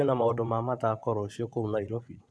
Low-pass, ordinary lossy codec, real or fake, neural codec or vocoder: none; none; fake; vocoder, 22.05 kHz, 80 mel bands, WaveNeXt